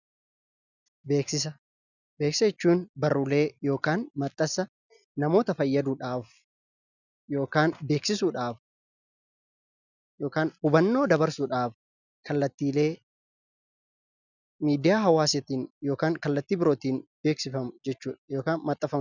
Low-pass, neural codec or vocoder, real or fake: 7.2 kHz; none; real